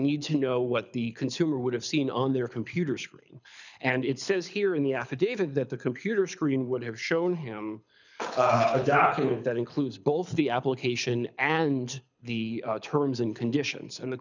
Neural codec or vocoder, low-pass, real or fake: codec, 24 kHz, 6 kbps, HILCodec; 7.2 kHz; fake